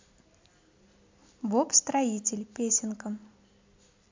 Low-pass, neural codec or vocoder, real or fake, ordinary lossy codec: 7.2 kHz; none; real; MP3, 64 kbps